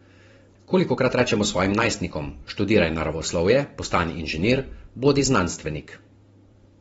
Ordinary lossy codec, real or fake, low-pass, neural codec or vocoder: AAC, 24 kbps; fake; 19.8 kHz; vocoder, 44.1 kHz, 128 mel bands every 512 samples, BigVGAN v2